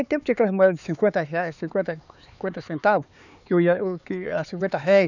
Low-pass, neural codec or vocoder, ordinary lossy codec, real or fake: 7.2 kHz; codec, 16 kHz, 4 kbps, X-Codec, HuBERT features, trained on LibriSpeech; none; fake